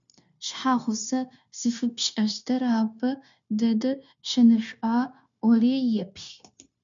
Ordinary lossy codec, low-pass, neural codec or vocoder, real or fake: MP3, 64 kbps; 7.2 kHz; codec, 16 kHz, 0.9 kbps, LongCat-Audio-Codec; fake